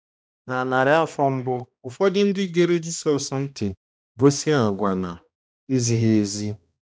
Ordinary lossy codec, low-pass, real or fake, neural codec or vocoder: none; none; fake; codec, 16 kHz, 1 kbps, X-Codec, HuBERT features, trained on balanced general audio